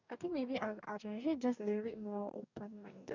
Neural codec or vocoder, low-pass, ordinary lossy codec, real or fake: codec, 44.1 kHz, 2.6 kbps, DAC; 7.2 kHz; none; fake